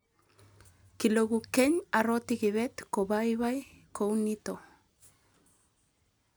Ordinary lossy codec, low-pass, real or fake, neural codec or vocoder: none; none; real; none